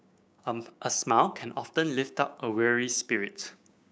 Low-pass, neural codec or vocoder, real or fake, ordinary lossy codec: none; codec, 16 kHz, 6 kbps, DAC; fake; none